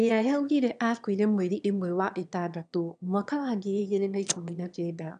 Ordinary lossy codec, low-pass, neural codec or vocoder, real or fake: none; 9.9 kHz; autoencoder, 22.05 kHz, a latent of 192 numbers a frame, VITS, trained on one speaker; fake